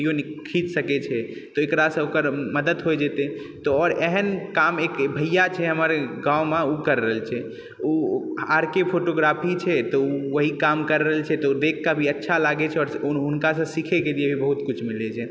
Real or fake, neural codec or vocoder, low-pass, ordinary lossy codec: real; none; none; none